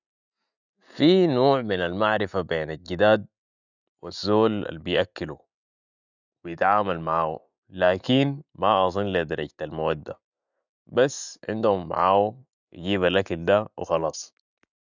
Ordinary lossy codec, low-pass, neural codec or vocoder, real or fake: none; 7.2 kHz; none; real